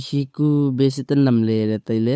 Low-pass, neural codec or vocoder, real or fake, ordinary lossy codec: none; codec, 16 kHz, 8 kbps, FunCodec, trained on Chinese and English, 25 frames a second; fake; none